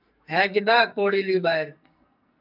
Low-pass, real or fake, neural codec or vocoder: 5.4 kHz; fake; codec, 44.1 kHz, 2.6 kbps, SNAC